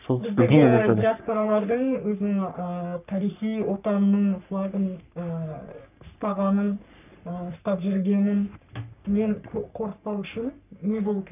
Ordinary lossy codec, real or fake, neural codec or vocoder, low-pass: none; fake; codec, 44.1 kHz, 3.4 kbps, Pupu-Codec; 3.6 kHz